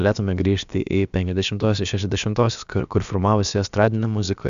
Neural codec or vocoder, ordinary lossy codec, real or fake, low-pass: codec, 16 kHz, about 1 kbps, DyCAST, with the encoder's durations; AAC, 96 kbps; fake; 7.2 kHz